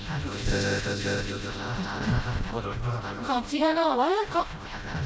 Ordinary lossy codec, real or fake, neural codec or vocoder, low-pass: none; fake; codec, 16 kHz, 0.5 kbps, FreqCodec, smaller model; none